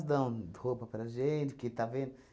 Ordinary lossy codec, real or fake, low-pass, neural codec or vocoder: none; real; none; none